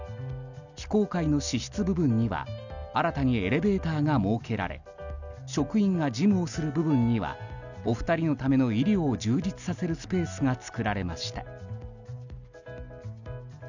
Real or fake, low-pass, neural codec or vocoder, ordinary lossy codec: real; 7.2 kHz; none; none